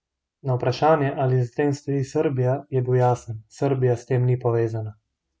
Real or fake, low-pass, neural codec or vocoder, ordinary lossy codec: real; none; none; none